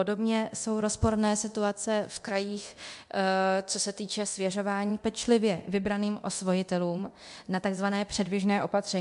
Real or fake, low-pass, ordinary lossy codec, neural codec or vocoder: fake; 10.8 kHz; MP3, 64 kbps; codec, 24 kHz, 0.9 kbps, DualCodec